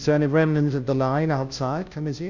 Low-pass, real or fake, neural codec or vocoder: 7.2 kHz; fake; codec, 16 kHz, 0.5 kbps, FunCodec, trained on Chinese and English, 25 frames a second